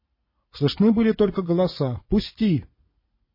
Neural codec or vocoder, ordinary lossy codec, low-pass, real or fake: vocoder, 44.1 kHz, 80 mel bands, Vocos; MP3, 24 kbps; 5.4 kHz; fake